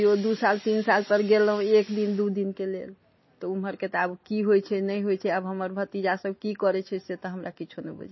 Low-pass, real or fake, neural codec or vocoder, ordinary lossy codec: 7.2 kHz; real; none; MP3, 24 kbps